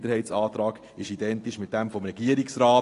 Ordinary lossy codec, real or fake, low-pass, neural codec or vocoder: AAC, 48 kbps; real; 10.8 kHz; none